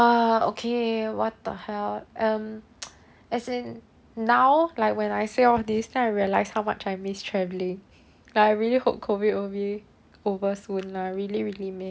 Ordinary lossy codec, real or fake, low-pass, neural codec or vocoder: none; real; none; none